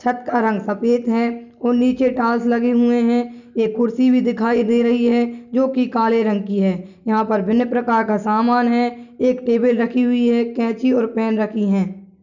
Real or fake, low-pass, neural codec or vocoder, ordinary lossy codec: real; 7.2 kHz; none; none